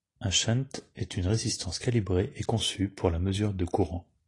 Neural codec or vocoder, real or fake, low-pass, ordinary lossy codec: none; real; 10.8 kHz; AAC, 32 kbps